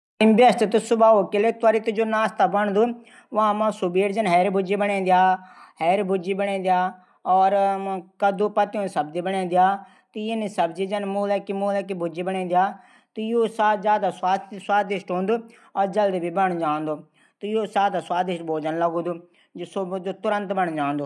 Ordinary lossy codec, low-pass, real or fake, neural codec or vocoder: none; none; real; none